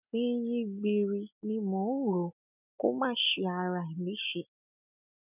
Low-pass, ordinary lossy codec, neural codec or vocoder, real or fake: 3.6 kHz; none; none; real